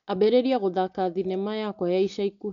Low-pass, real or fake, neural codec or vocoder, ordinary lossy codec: 7.2 kHz; real; none; MP3, 96 kbps